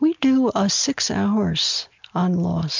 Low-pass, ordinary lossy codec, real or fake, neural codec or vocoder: 7.2 kHz; MP3, 64 kbps; real; none